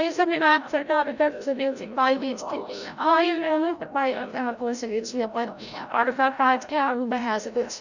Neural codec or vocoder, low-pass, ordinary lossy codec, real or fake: codec, 16 kHz, 0.5 kbps, FreqCodec, larger model; 7.2 kHz; none; fake